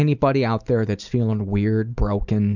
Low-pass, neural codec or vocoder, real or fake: 7.2 kHz; none; real